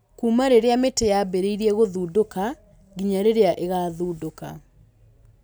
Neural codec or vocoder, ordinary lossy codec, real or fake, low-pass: none; none; real; none